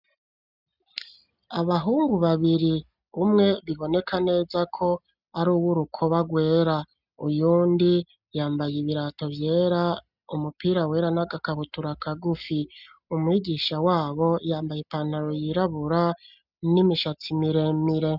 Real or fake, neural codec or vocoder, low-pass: real; none; 5.4 kHz